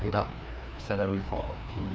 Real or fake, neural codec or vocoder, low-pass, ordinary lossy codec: fake; codec, 16 kHz, 2 kbps, FreqCodec, larger model; none; none